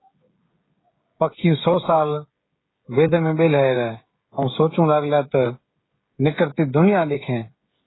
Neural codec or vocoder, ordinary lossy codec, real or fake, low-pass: codec, 16 kHz, 16 kbps, FreqCodec, smaller model; AAC, 16 kbps; fake; 7.2 kHz